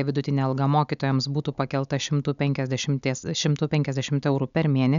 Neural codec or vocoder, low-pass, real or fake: none; 7.2 kHz; real